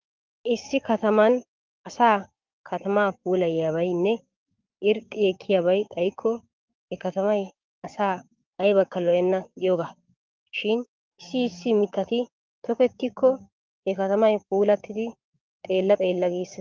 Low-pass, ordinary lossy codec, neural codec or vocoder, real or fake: 7.2 kHz; Opus, 24 kbps; codec, 16 kHz, 6 kbps, DAC; fake